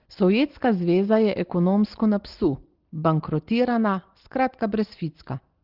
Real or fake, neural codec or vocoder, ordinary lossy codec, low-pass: real; none; Opus, 16 kbps; 5.4 kHz